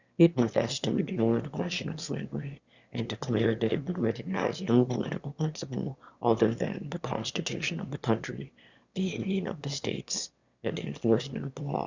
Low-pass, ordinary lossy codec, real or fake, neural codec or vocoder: 7.2 kHz; Opus, 64 kbps; fake; autoencoder, 22.05 kHz, a latent of 192 numbers a frame, VITS, trained on one speaker